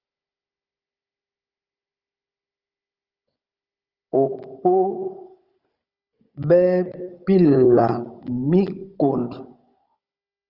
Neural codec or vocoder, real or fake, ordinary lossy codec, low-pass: codec, 16 kHz, 16 kbps, FunCodec, trained on Chinese and English, 50 frames a second; fake; Opus, 24 kbps; 5.4 kHz